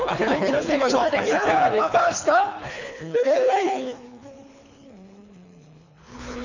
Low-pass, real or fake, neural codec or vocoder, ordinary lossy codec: 7.2 kHz; fake; codec, 24 kHz, 3 kbps, HILCodec; none